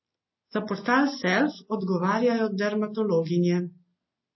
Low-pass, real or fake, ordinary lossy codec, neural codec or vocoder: 7.2 kHz; real; MP3, 24 kbps; none